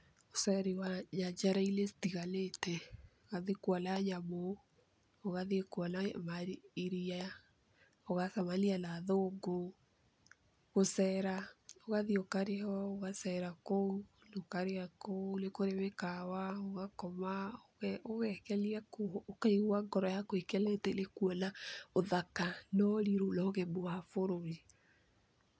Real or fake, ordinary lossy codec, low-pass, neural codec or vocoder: real; none; none; none